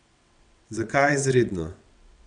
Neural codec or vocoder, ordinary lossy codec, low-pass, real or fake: vocoder, 22.05 kHz, 80 mel bands, WaveNeXt; none; 9.9 kHz; fake